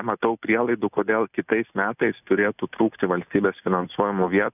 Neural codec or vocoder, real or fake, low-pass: none; real; 3.6 kHz